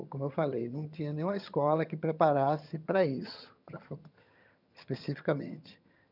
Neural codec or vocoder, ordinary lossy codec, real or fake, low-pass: vocoder, 22.05 kHz, 80 mel bands, HiFi-GAN; none; fake; 5.4 kHz